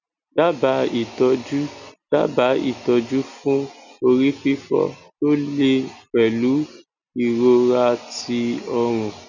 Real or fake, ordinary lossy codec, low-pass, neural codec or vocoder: real; none; 7.2 kHz; none